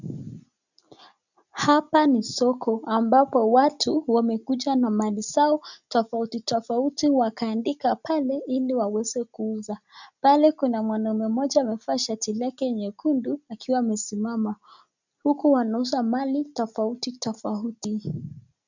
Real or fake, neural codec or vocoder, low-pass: real; none; 7.2 kHz